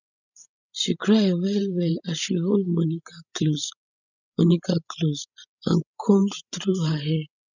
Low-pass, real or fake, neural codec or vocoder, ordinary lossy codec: 7.2 kHz; fake; codec, 16 kHz, 16 kbps, FreqCodec, larger model; none